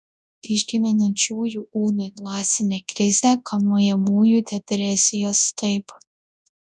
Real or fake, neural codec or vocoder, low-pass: fake; codec, 24 kHz, 0.9 kbps, WavTokenizer, large speech release; 10.8 kHz